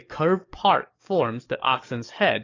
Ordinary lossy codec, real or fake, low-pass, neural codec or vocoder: AAC, 32 kbps; fake; 7.2 kHz; codec, 44.1 kHz, 7.8 kbps, DAC